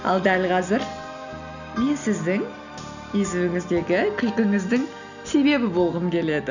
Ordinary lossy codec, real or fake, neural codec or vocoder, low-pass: none; real; none; 7.2 kHz